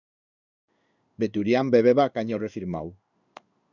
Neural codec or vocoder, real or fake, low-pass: codec, 16 kHz in and 24 kHz out, 1 kbps, XY-Tokenizer; fake; 7.2 kHz